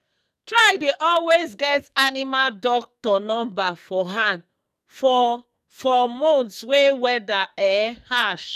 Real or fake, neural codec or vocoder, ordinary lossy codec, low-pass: fake; codec, 44.1 kHz, 2.6 kbps, SNAC; none; 14.4 kHz